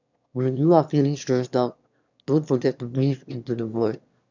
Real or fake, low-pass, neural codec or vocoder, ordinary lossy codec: fake; 7.2 kHz; autoencoder, 22.05 kHz, a latent of 192 numbers a frame, VITS, trained on one speaker; none